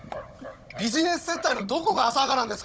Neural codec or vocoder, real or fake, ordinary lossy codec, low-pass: codec, 16 kHz, 16 kbps, FunCodec, trained on Chinese and English, 50 frames a second; fake; none; none